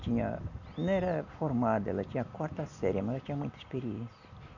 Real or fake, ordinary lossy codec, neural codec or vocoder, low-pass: real; none; none; 7.2 kHz